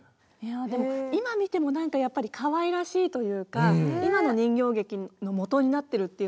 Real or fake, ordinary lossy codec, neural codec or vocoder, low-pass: real; none; none; none